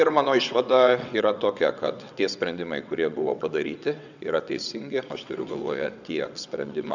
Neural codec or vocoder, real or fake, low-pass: vocoder, 22.05 kHz, 80 mel bands, WaveNeXt; fake; 7.2 kHz